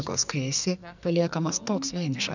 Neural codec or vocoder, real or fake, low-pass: codec, 44.1 kHz, 2.6 kbps, SNAC; fake; 7.2 kHz